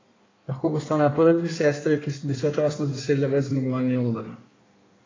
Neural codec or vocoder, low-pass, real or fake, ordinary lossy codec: codec, 16 kHz in and 24 kHz out, 1.1 kbps, FireRedTTS-2 codec; 7.2 kHz; fake; AAC, 32 kbps